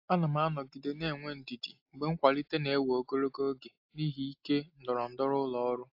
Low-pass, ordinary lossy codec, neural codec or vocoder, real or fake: 5.4 kHz; AAC, 48 kbps; none; real